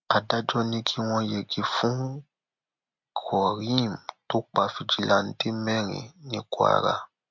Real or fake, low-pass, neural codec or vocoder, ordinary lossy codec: real; 7.2 kHz; none; MP3, 64 kbps